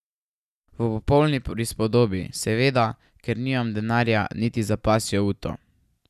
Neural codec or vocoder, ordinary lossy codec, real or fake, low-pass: none; none; real; 14.4 kHz